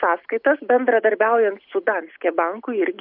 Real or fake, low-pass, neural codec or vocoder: real; 5.4 kHz; none